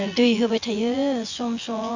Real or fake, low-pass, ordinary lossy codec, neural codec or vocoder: fake; 7.2 kHz; Opus, 64 kbps; vocoder, 24 kHz, 100 mel bands, Vocos